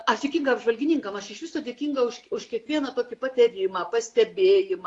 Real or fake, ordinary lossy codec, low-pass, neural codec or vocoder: real; AAC, 48 kbps; 10.8 kHz; none